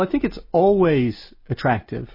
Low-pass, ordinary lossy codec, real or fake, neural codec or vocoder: 5.4 kHz; MP3, 24 kbps; real; none